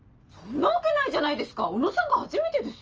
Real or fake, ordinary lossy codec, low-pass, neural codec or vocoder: real; Opus, 24 kbps; 7.2 kHz; none